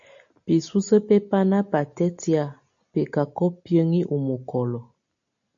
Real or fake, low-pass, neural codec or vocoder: real; 7.2 kHz; none